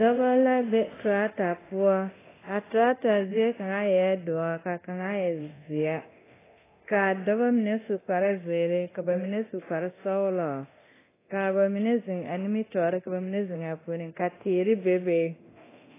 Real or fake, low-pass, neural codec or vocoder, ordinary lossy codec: fake; 3.6 kHz; codec, 24 kHz, 0.9 kbps, DualCodec; AAC, 16 kbps